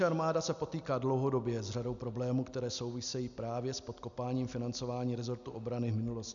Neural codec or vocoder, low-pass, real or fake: none; 7.2 kHz; real